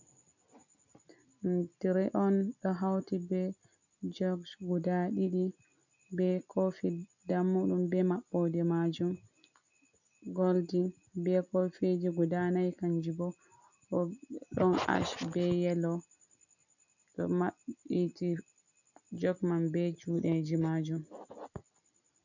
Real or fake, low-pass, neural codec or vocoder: real; 7.2 kHz; none